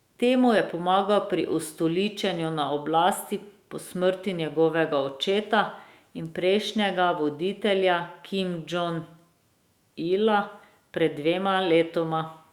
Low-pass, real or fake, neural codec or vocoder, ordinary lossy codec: 19.8 kHz; fake; autoencoder, 48 kHz, 128 numbers a frame, DAC-VAE, trained on Japanese speech; Opus, 64 kbps